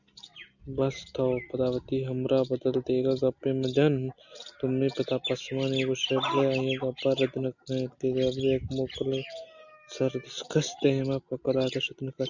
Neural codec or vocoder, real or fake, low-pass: none; real; 7.2 kHz